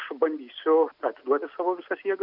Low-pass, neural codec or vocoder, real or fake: 5.4 kHz; none; real